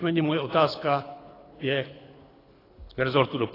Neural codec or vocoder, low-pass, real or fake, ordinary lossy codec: codec, 24 kHz, 6 kbps, HILCodec; 5.4 kHz; fake; AAC, 24 kbps